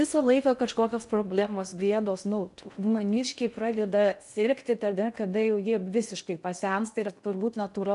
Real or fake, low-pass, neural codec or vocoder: fake; 10.8 kHz; codec, 16 kHz in and 24 kHz out, 0.6 kbps, FocalCodec, streaming, 2048 codes